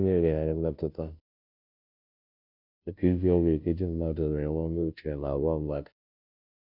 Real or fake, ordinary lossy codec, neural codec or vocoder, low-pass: fake; none; codec, 16 kHz, 0.5 kbps, FunCodec, trained on Chinese and English, 25 frames a second; 5.4 kHz